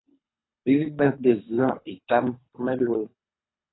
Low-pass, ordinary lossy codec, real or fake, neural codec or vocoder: 7.2 kHz; AAC, 16 kbps; fake; codec, 24 kHz, 3 kbps, HILCodec